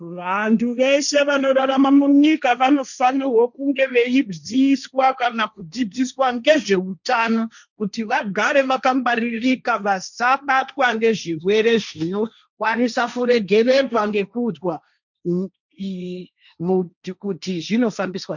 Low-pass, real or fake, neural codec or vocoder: 7.2 kHz; fake; codec, 16 kHz, 1.1 kbps, Voila-Tokenizer